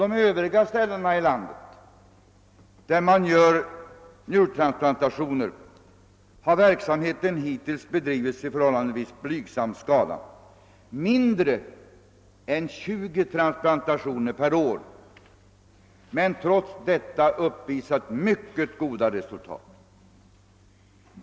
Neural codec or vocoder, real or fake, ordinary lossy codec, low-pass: none; real; none; none